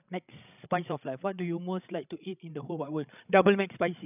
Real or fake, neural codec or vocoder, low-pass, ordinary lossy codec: fake; codec, 16 kHz, 16 kbps, FreqCodec, larger model; 3.6 kHz; none